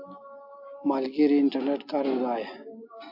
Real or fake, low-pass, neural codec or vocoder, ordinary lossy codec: real; 5.4 kHz; none; AAC, 48 kbps